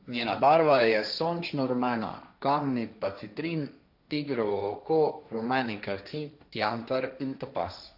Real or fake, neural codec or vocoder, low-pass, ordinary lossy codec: fake; codec, 16 kHz, 1.1 kbps, Voila-Tokenizer; 5.4 kHz; AAC, 48 kbps